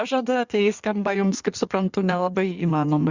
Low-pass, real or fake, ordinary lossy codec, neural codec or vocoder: 7.2 kHz; fake; Opus, 64 kbps; codec, 16 kHz in and 24 kHz out, 1.1 kbps, FireRedTTS-2 codec